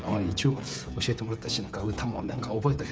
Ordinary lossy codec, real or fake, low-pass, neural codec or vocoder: none; fake; none; codec, 16 kHz, 16 kbps, FreqCodec, smaller model